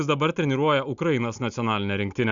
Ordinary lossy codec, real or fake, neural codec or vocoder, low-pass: Opus, 64 kbps; real; none; 7.2 kHz